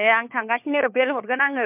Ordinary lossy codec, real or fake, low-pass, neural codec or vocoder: MP3, 32 kbps; fake; 3.6 kHz; codec, 16 kHz, 4 kbps, FunCodec, trained on LibriTTS, 50 frames a second